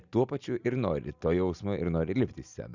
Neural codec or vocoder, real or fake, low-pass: none; real; 7.2 kHz